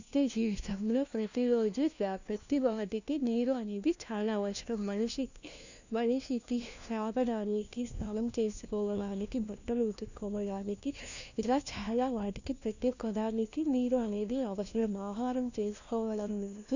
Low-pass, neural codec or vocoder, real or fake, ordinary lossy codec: 7.2 kHz; codec, 16 kHz, 1 kbps, FunCodec, trained on LibriTTS, 50 frames a second; fake; none